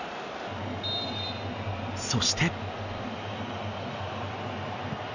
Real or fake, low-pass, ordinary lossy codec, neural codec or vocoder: real; 7.2 kHz; none; none